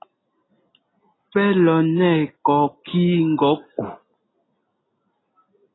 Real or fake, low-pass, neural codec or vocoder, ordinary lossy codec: real; 7.2 kHz; none; AAC, 16 kbps